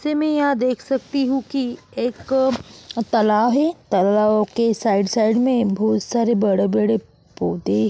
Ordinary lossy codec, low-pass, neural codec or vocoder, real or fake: none; none; none; real